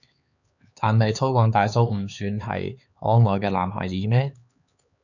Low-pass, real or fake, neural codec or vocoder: 7.2 kHz; fake; codec, 16 kHz, 4 kbps, X-Codec, HuBERT features, trained on LibriSpeech